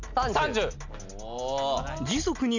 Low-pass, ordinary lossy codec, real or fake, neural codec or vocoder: 7.2 kHz; none; real; none